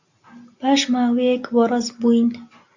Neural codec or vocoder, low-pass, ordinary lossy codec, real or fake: none; 7.2 kHz; AAC, 48 kbps; real